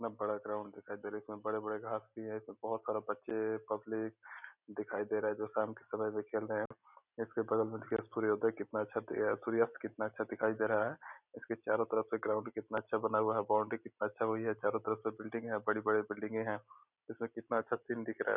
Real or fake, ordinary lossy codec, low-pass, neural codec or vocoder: real; none; 3.6 kHz; none